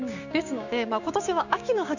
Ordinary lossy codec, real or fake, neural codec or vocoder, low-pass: none; fake; codec, 16 kHz, 6 kbps, DAC; 7.2 kHz